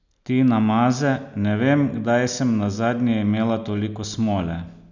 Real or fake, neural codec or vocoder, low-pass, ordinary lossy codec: real; none; 7.2 kHz; none